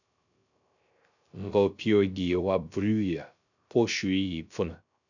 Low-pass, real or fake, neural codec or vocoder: 7.2 kHz; fake; codec, 16 kHz, 0.3 kbps, FocalCodec